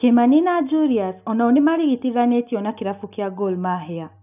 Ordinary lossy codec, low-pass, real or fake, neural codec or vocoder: none; 3.6 kHz; real; none